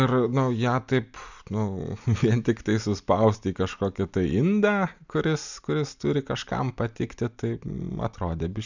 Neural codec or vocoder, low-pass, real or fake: none; 7.2 kHz; real